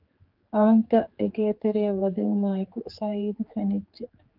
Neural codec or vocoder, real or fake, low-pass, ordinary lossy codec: codec, 16 kHz, 4 kbps, X-Codec, HuBERT features, trained on general audio; fake; 5.4 kHz; Opus, 32 kbps